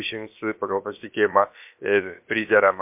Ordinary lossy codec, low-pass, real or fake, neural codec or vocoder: MP3, 32 kbps; 3.6 kHz; fake; codec, 16 kHz, about 1 kbps, DyCAST, with the encoder's durations